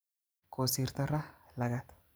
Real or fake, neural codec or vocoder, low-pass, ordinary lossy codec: fake; vocoder, 44.1 kHz, 128 mel bands every 256 samples, BigVGAN v2; none; none